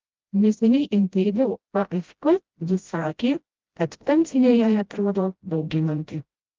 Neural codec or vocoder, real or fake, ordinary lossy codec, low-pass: codec, 16 kHz, 0.5 kbps, FreqCodec, smaller model; fake; Opus, 24 kbps; 7.2 kHz